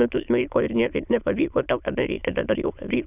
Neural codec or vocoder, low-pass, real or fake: autoencoder, 22.05 kHz, a latent of 192 numbers a frame, VITS, trained on many speakers; 3.6 kHz; fake